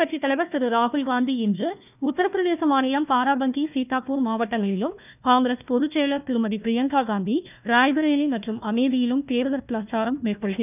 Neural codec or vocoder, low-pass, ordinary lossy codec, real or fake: codec, 16 kHz, 1 kbps, FunCodec, trained on Chinese and English, 50 frames a second; 3.6 kHz; none; fake